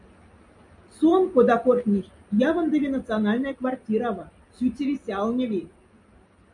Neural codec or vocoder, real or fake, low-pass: none; real; 10.8 kHz